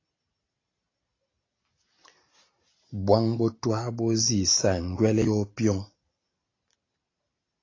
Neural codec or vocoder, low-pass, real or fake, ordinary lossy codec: none; 7.2 kHz; real; AAC, 32 kbps